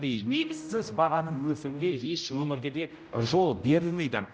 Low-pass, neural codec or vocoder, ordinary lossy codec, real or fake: none; codec, 16 kHz, 0.5 kbps, X-Codec, HuBERT features, trained on general audio; none; fake